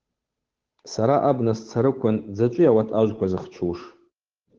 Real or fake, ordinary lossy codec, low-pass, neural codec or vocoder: fake; Opus, 16 kbps; 7.2 kHz; codec, 16 kHz, 8 kbps, FunCodec, trained on Chinese and English, 25 frames a second